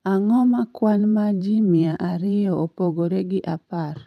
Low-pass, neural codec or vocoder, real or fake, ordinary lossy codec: 14.4 kHz; vocoder, 44.1 kHz, 128 mel bands every 512 samples, BigVGAN v2; fake; none